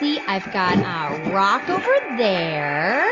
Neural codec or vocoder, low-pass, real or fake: none; 7.2 kHz; real